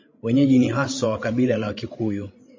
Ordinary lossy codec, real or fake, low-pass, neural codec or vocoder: MP3, 32 kbps; fake; 7.2 kHz; codec, 16 kHz, 16 kbps, FreqCodec, larger model